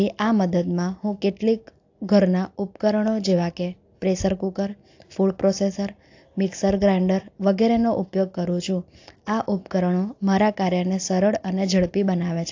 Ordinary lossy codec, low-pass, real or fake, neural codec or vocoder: AAC, 48 kbps; 7.2 kHz; real; none